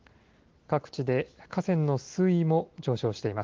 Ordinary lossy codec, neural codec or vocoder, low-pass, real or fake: Opus, 16 kbps; none; 7.2 kHz; real